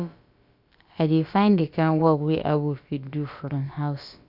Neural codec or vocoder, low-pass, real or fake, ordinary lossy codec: codec, 16 kHz, about 1 kbps, DyCAST, with the encoder's durations; 5.4 kHz; fake; Opus, 64 kbps